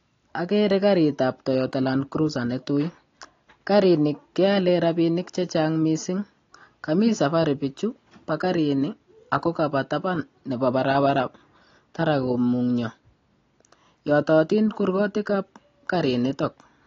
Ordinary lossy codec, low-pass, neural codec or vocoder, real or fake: AAC, 32 kbps; 7.2 kHz; none; real